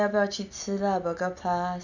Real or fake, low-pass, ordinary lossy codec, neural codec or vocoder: real; 7.2 kHz; none; none